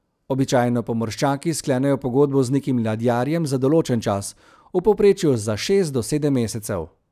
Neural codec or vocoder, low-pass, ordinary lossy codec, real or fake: none; 14.4 kHz; none; real